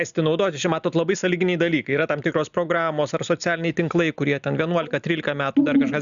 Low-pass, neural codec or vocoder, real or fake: 7.2 kHz; none; real